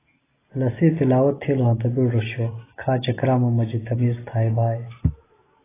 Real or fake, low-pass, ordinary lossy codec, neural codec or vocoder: real; 3.6 kHz; AAC, 16 kbps; none